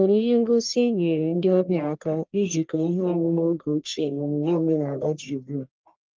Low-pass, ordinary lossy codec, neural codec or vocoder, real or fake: 7.2 kHz; Opus, 32 kbps; codec, 44.1 kHz, 1.7 kbps, Pupu-Codec; fake